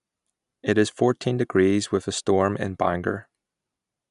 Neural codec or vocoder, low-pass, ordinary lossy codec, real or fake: none; 10.8 kHz; none; real